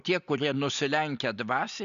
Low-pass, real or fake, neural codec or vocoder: 7.2 kHz; real; none